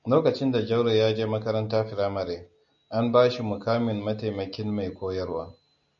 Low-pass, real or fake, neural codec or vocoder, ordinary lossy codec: 7.2 kHz; real; none; MP3, 48 kbps